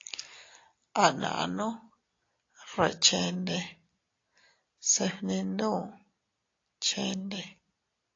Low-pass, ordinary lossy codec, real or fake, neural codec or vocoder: 7.2 kHz; AAC, 32 kbps; real; none